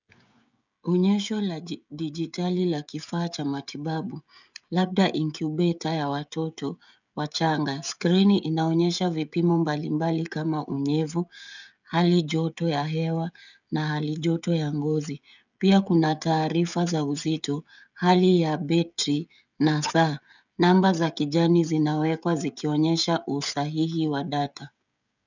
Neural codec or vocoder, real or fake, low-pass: codec, 16 kHz, 16 kbps, FreqCodec, smaller model; fake; 7.2 kHz